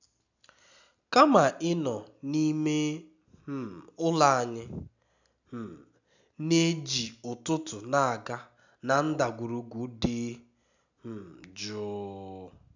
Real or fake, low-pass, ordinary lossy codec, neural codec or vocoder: real; 7.2 kHz; none; none